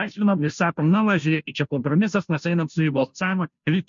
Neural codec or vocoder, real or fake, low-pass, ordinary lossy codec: codec, 16 kHz, 0.5 kbps, FunCodec, trained on Chinese and English, 25 frames a second; fake; 7.2 kHz; MP3, 48 kbps